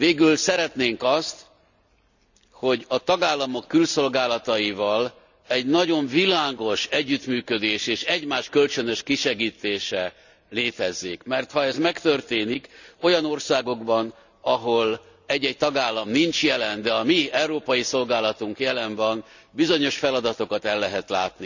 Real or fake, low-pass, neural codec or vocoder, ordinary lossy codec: real; 7.2 kHz; none; none